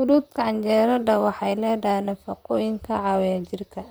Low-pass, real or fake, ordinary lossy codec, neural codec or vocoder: none; fake; none; vocoder, 44.1 kHz, 128 mel bands, Pupu-Vocoder